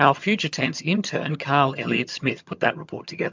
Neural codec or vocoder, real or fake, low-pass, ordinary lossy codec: vocoder, 22.05 kHz, 80 mel bands, HiFi-GAN; fake; 7.2 kHz; MP3, 64 kbps